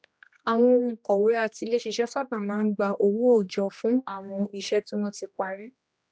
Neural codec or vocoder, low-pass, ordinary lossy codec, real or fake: codec, 16 kHz, 1 kbps, X-Codec, HuBERT features, trained on general audio; none; none; fake